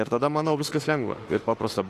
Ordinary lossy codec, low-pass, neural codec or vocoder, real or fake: AAC, 48 kbps; 14.4 kHz; autoencoder, 48 kHz, 32 numbers a frame, DAC-VAE, trained on Japanese speech; fake